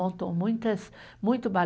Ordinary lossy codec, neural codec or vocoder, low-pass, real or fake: none; none; none; real